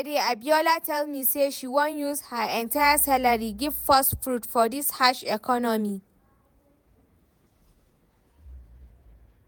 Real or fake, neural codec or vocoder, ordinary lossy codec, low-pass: fake; vocoder, 48 kHz, 128 mel bands, Vocos; none; none